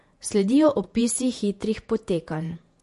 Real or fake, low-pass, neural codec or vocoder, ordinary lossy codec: fake; 14.4 kHz; vocoder, 48 kHz, 128 mel bands, Vocos; MP3, 48 kbps